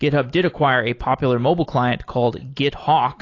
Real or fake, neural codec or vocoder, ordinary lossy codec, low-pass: real; none; AAC, 32 kbps; 7.2 kHz